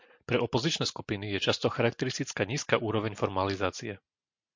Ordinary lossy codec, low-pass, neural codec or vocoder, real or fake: MP3, 48 kbps; 7.2 kHz; none; real